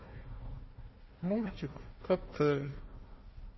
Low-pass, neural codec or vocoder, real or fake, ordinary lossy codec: 7.2 kHz; codec, 16 kHz, 1 kbps, FunCodec, trained on Chinese and English, 50 frames a second; fake; MP3, 24 kbps